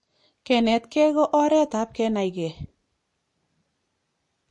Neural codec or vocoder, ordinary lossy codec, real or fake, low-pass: none; MP3, 48 kbps; real; 10.8 kHz